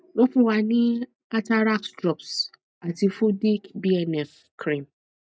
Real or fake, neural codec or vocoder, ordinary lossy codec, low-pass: real; none; none; none